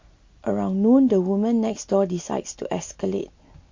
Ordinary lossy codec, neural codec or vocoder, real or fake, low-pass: MP3, 48 kbps; none; real; 7.2 kHz